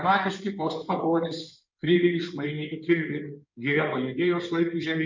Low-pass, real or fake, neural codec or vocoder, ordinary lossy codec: 7.2 kHz; fake; codec, 16 kHz in and 24 kHz out, 2.2 kbps, FireRedTTS-2 codec; MP3, 48 kbps